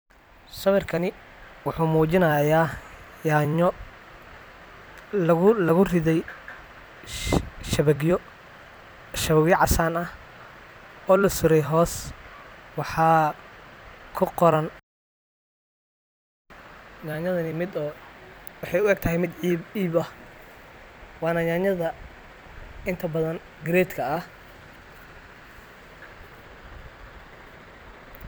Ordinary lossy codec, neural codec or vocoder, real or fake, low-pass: none; vocoder, 44.1 kHz, 128 mel bands every 256 samples, BigVGAN v2; fake; none